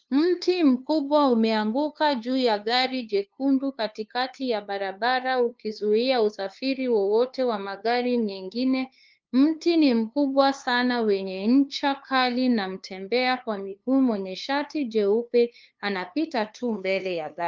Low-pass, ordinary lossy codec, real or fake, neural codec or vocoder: 7.2 kHz; Opus, 24 kbps; fake; codec, 16 kHz, 2 kbps, FunCodec, trained on LibriTTS, 25 frames a second